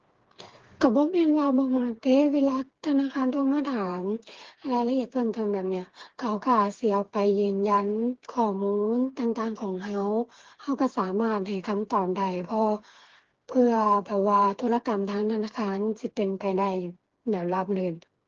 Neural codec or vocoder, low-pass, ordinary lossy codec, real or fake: codec, 16 kHz, 4 kbps, FreqCodec, smaller model; 7.2 kHz; Opus, 16 kbps; fake